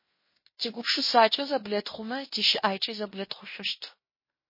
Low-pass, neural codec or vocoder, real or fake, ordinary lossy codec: 5.4 kHz; codec, 16 kHz in and 24 kHz out, 0.9 kbps, LongCat-Audio-Codec, fine tuned four codebook decoder; fake; MP3, 24 kbps